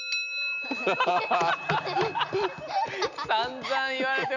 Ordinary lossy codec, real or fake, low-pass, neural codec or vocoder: none; real; 7.2 kHz; none